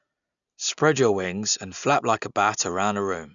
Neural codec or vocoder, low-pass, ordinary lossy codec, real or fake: none; 7.2 kHz; none; real